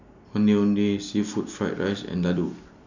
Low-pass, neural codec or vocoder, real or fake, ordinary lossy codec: 7.2 kHz; none; real; none